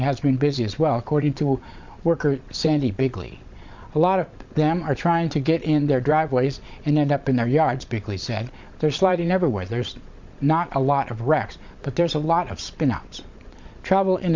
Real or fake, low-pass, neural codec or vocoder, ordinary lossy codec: fake; 7.2 kHz; vocoder, 22.05 kHz, 80 mel bands, Vocos; MP3, 64 kbps